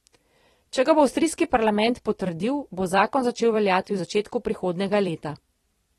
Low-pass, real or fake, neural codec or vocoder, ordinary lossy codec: 19.8 kHz; fake; vocoder, 44.1 kHz, 128 mel bands every 256 samples, BigVGAN v2; AAC, 32 kbps